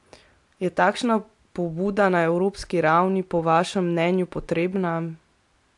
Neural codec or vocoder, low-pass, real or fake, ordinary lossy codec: none; 10.8 kHz; real; AAC, 64 kbps